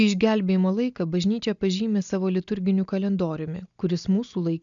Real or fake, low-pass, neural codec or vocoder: real; 7.2 kHz; none